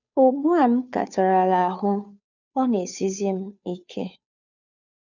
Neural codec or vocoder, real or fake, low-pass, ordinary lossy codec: codec, 16 kHz, 2 kbps, FunCodec, trained on Chinese and English, 25 frames a second; fake; 7.2 kHz; none